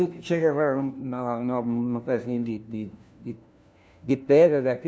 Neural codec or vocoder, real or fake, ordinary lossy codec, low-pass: codec, 16 kHz, 1 kbps, FunCodec, trained on LibriTTS, 50 frames a second; fake; none; none